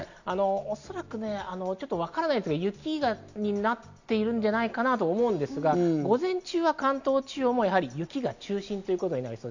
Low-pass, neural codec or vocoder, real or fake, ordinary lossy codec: 7.2 kHz; none; real; none